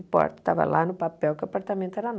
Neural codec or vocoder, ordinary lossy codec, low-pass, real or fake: none; none; none; real